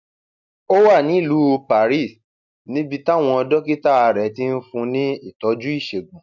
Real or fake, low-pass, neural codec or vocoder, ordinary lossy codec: real; 7.2 kHz; none; none